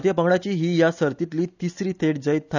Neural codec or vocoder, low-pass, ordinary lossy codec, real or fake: none; 7.2 kHz; none; real